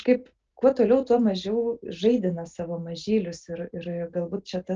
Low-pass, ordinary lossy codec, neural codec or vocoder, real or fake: 7.2 kHz; Opus, 24 kbps; none; real